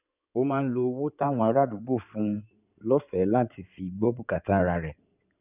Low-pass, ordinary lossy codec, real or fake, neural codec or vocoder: 3.6 kHz; none; fake; codec, 16 kHz in and 24 kHz out, 2.2 kbps, FireRedTTS-2 codec